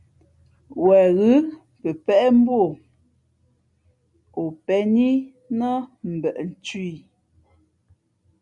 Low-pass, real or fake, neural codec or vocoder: 10.8 kHz; real; none